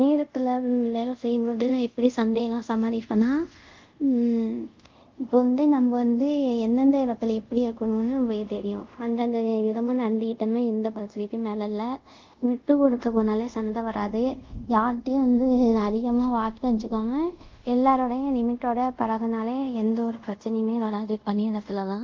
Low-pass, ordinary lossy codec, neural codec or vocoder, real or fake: 7.2 kHz; Opus, 24 kbps; codec, 24 kHz, 0.5 kbps, DualCodec; fake